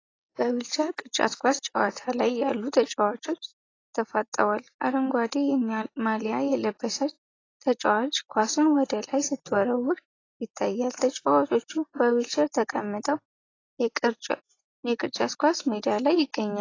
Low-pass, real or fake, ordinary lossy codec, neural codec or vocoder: 7.2 kHz; real; AAC, 32 kbps; none